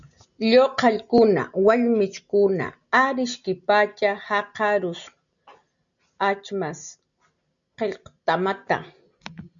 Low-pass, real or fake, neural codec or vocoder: 7.2 kHz; real; none